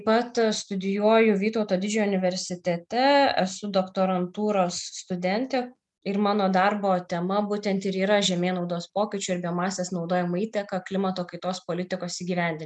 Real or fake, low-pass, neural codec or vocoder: real; 10.8 kHz; none